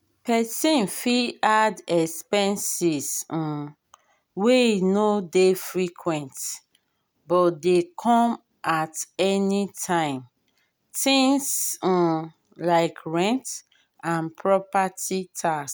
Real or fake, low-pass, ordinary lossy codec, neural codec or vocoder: real; none; none; none